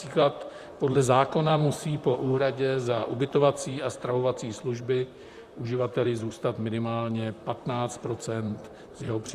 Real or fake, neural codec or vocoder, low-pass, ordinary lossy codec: fake; vocoder, 44.1 kHz, 128 mel bands, Pupu-Vocoder; 14.4 kHz; Opus, 64 kbps